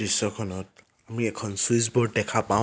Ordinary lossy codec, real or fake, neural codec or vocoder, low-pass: none; real; none; none